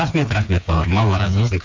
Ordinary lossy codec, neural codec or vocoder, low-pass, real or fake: MP3, 48 kbps; codec, 16 kHz, 4 kbps, FreqCodec, smaller model; 7.2 kHz; fake